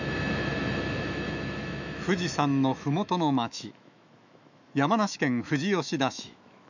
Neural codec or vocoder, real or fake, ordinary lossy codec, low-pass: autoencoder, 48 kHz, 128 numbers a frame, DAC-VAE, trained on Japanese speech; fake; none; 7.2 kHz